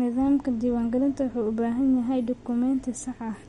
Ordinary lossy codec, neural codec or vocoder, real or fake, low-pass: MP3, 48 kbps; none; real; 19.8 kHz